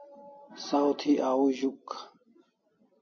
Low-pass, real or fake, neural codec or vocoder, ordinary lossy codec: 7.2 kHz; real; none; MP3, 32 kbps